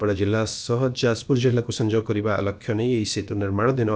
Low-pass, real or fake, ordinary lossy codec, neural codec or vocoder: none; fake; none; codec, 16 kHz, about 1 kbps, DyCAST, with the encoder's durations